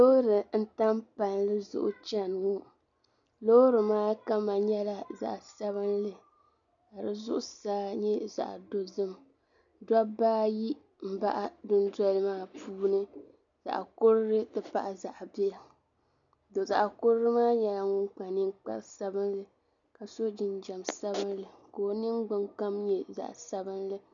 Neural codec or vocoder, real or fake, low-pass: none; real; 7.2 kHz